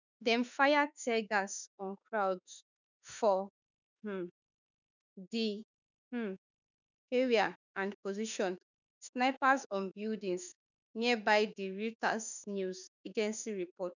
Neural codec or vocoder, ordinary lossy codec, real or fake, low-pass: autoencoder, 48 kHz, 32 numbers a frame, DAC-VAE, trained on Japanese speech; none; fake; 7.2 kHz